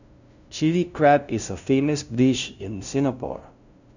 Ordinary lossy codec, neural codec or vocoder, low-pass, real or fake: none; codec, 16 kHz, 0.5 kbps, FunCodec, trained on LibriTTS, 25 frames a second; 7.2 kHz; fake